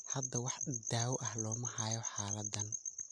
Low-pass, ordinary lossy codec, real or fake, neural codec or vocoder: none; none; real; none